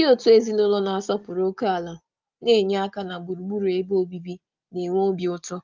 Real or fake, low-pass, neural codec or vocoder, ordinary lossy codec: real; 7.2 kHz; none; Opus, 32 kbps